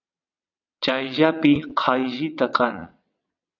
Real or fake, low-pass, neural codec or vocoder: fake; 7.2 kHz; vocoder, 22.05 kHz, 80 mel bands, WaveNeXt